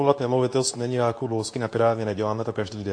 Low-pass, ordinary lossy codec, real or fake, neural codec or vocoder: 9.9 kHz; AAC, 48 kbps; fake; codec, 24 kHz, 0.9 kbps, WavTokenizer, medium speech release version 2